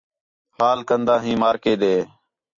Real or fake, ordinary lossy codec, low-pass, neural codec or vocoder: real; AAC, 48 kbps; 7.2 kHz; none